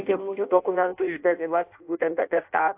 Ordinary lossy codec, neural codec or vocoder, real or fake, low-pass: AAC, 32 kbps; codec, 16 kHz in and 24 kHz out, 0.6 kbps, FireRedTTS-2 codec; fake; 3.6 kHz